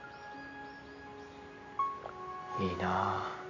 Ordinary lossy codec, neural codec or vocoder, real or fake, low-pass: none; none; real; 7.2 kHz